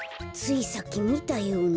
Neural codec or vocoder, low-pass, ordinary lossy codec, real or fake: none; none; none; real